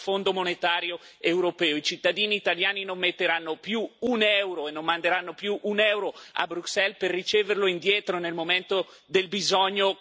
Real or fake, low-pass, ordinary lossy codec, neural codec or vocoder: real; none; none; none